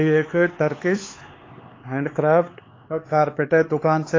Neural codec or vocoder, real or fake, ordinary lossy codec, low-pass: codec, 16 kHz, 4 kbps, X-Codec, HuBERT features, trained on LibriSpeech; fake; AAC, 32 kbps; 7.2 kHz